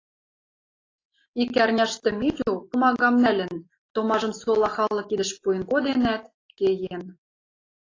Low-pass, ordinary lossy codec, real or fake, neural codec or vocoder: 7.2 kHz; AAC, 32 kbps; real; none